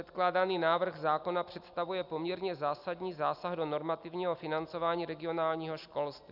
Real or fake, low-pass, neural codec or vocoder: real; 5.4 kHz; none